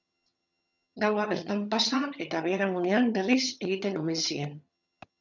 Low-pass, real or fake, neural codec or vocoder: 7.2 kHz; fake; vocoder, 22.05 kHz, 80 mel bands, HiFi-GAN